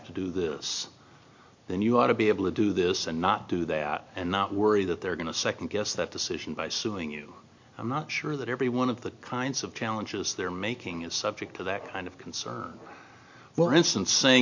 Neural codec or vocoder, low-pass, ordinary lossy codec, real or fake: none; 7.2 kHz; MP3, 48 kbps; real